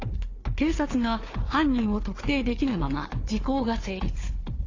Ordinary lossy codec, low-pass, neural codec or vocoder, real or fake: AAC, 32 kbps; 7.2 kHz; codec, 16 kHz, 4 kbps, FunCodec, trained on Chinese and English, 50 frames a second; fake